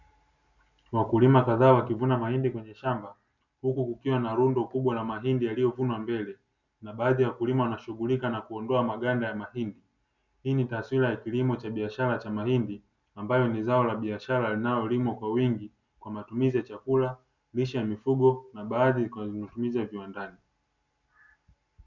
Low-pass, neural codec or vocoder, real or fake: 7.2 kHz; none; real